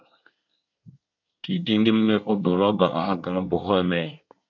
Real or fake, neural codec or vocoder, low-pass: fake; codec, 24 kHz, 1 kbps, SNAC; 7.2 kHz